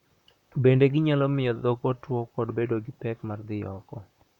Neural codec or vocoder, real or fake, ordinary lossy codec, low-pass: vocoder, 44.1 kHz, 128 mel bands, Pupu-Vocoder; fake; none; 19.8 kHz